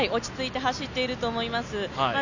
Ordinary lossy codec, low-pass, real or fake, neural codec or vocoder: none; 7.2 kHz; real; none